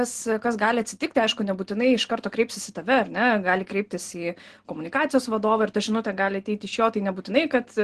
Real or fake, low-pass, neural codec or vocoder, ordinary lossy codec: real; 10.8 kHz; none; Opus, 16 kbps